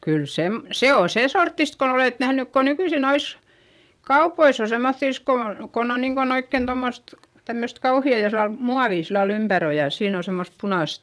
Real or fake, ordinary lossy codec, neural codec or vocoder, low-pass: fake; none; vocoder, 22.05 kHz, 80 mel bands, WaveNeXt; none